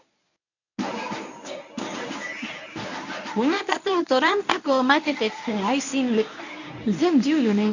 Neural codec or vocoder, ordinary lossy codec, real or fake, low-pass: codec, 24 kHz, 0.9 kbps, WavTokenizer, medium speech release version 1; none; fake; 7.2 kHz